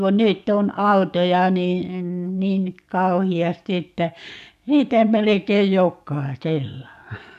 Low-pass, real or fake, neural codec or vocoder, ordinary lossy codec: 14.4 kHz; fake; codec, 44.1 kHz, 7.8 kbps, DAC; none